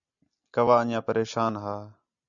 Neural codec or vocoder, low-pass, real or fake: none; 7.2 kHz; real